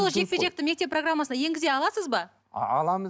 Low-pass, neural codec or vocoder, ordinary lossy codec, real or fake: none; none; none; real